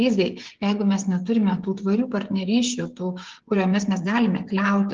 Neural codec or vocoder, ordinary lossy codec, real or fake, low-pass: codec, 16 kHz, 16 kbps, FreqCodec, smaller model; Opus, 16 kbps; fake; 7.2 kHz